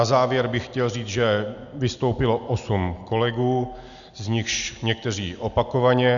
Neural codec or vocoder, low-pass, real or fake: none; 7.2 kHz; real